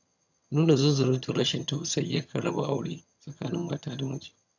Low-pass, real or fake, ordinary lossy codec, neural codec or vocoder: 7.2 kHz; fake; none; vocoder, 22.05 kHz, 80 mel bands, HiFi-GAN